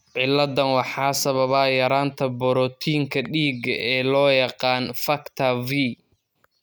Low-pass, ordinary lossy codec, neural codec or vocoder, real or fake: none; none; none; real